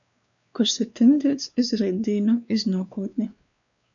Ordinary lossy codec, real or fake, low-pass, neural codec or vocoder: MP3, 96 kbps; fake; 7.2 kHz; codec, 16 kHz, 2 kbps, X-Codec, WavLM features, trained on Multilingual LibriSpeech